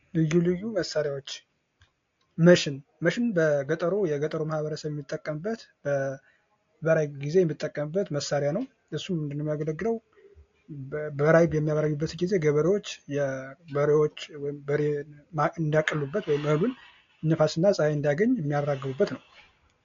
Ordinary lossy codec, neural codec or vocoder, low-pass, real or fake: AAC, 48 kbps; none; 7.2 kHz; real